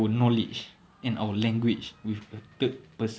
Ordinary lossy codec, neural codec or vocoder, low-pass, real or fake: none; none; none; real